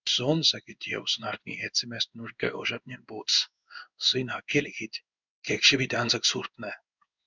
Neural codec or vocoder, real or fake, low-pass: codec, 16 kHz in and 24 kHz out, 1 kbps, XY-Tokenizer; fake; 7.2 kHz